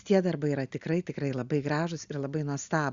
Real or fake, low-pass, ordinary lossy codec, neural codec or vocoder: real; 7.2 kHz; Opus, 64 kbps; none